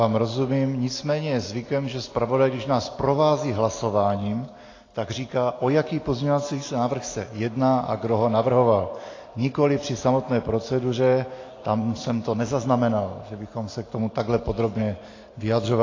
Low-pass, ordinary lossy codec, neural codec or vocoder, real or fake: 7.2 kHz; AAC, 32 kbps; none; real